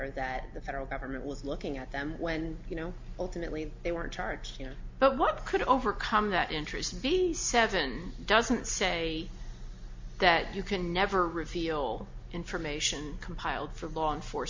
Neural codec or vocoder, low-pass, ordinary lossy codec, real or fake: none; 7.2 kHz; MP3, 48 kbps; real